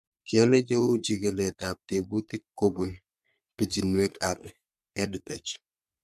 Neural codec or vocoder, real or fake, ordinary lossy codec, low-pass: codec, 44.1 kHz, 3.4 kbps, Pupu-Codec; fake; MP3, 96 kbps; 14.4 kHz